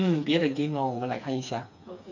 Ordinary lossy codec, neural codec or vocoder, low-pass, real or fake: none; codec, 16 kHz, 4 kbps, FreqCodec, smaller model; 7.2 kHz; fake